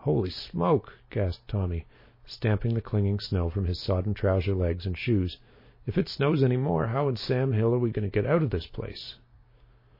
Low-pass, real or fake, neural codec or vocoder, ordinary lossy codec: 5.4 kHz; real; none; MP3, 24 kbps